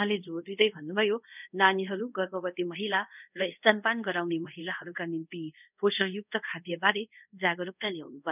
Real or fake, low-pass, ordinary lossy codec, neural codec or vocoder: fake; 3.6 kHz; none; codec, 24 kHz, 0.5 kbps, DualCodec